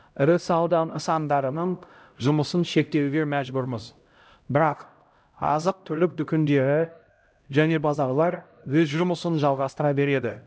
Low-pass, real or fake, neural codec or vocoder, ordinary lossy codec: none; fake; codec, 16 kHz, 0.5 kbps, X-Codec, HuBERT features, trained on LibriSpeech; none